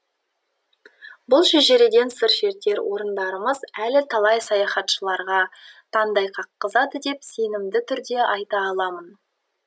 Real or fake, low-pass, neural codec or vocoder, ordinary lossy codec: real; none; none; none